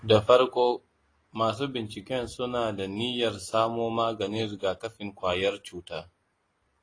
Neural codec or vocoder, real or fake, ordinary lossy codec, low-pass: none; real; AAC, 32 kbps; 9.9 kHz